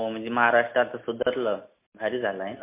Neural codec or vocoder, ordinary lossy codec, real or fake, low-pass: none; MP3, 24 kbps; real; 3.6 kHz